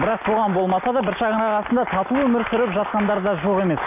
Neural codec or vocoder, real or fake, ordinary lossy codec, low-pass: none; real; none; 3.6 kHz